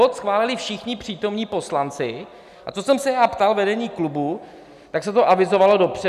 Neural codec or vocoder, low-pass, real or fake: none; 14.4 kHz; real